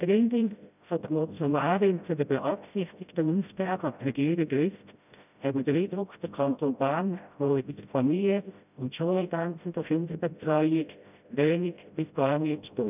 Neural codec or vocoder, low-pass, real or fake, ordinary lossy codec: codec, 16 kHz, 0.5 kbps, FreqCodec, smaller model; 3.6 kHz; fake; none